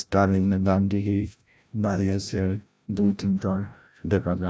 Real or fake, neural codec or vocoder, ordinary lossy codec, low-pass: fake; codec, 16 kHz, 0.5 kbps, FreqCodec, larger model; none; none